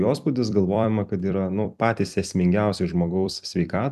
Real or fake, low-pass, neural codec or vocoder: fake; 14.4 kHz; vocoder, 44.1 kHz, 128 mel bands every 256 samples, BigVGAN v2